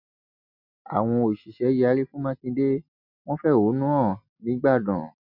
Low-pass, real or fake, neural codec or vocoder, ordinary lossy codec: 5.4 kHz; real; none; none